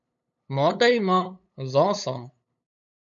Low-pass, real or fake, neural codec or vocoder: 7.2 kHz; fake; codec, 16 kHz, 8 kbps, FunCodec, trained on LibriTTS, 25 frames a second